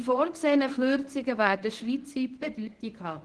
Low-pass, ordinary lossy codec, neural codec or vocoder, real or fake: 10.8 kHz; Opus, 16 kbps; codec, 24 kHz, 0.9 kbps, WavTokenizer, medium speech release version 1; fake